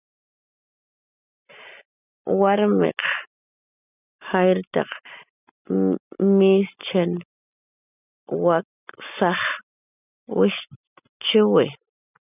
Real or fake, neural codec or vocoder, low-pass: real; none; 3.6 kHz